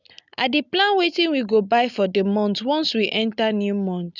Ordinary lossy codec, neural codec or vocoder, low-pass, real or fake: none; none; 7.2 kHz; real